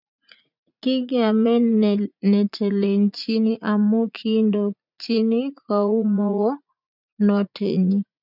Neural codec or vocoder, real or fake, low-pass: vocoder, 44.1 kHz, 80 mel bands, Vocos; fake; 5.4 kHz